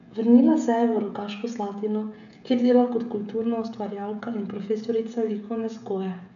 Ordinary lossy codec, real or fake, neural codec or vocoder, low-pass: none; fake; codec, 16 kHz, 16 kbps, FreqCodec, smaller model; 7.2 kHz